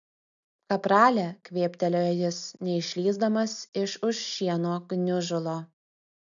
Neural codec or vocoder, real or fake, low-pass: none; real; 7.2 kHz